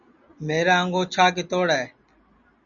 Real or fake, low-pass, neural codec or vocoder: real; 7.2 kHz; none